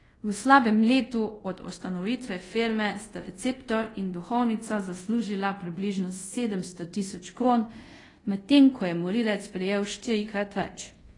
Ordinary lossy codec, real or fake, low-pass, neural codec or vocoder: AAC, 32 kbps; fake; 10.8 kHz; codec, 24 kHz, 0.5 kbps, DualCodec